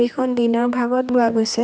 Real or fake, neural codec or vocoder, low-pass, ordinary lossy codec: fake; codec, 16 kHz, 2 kbps, X-Codec, HuBERT features, trained on general audio; none; none